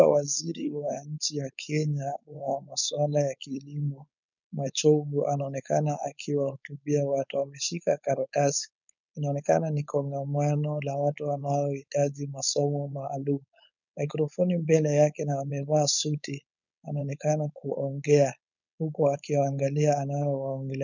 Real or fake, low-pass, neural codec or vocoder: fake; 7.2 kHz; codec, 16 kHz, 4.8 kbps, FACodec